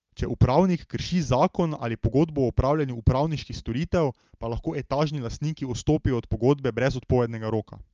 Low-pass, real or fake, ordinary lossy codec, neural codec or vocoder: 7.2 kHz; real; Opus, 24 kbps; none